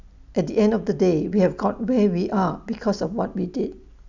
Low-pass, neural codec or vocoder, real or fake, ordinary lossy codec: 7.2 kHz; none; real; none